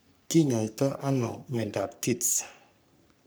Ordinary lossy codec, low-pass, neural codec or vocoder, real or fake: none; none; codec, 44.1 kHz, 3.4 kbps, Pupu-Codec; fake